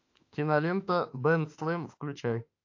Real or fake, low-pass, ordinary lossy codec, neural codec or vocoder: fake; 7.2 kHz; AAC, 48 kbps; autoencoder, 48 kHz, 32 numbers a frame, DAC-VAE, trained on Japanese speech